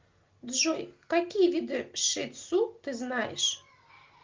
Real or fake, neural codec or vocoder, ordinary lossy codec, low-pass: real; none; Opus, 24 kbps; 7.2 kHz